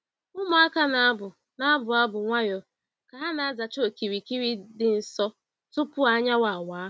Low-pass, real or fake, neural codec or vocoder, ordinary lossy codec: none; real; none; none